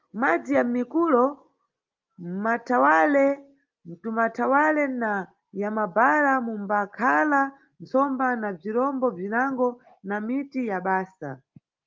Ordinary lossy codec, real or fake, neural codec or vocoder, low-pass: Opus, 32 kbps; real; none; 7.2 kHz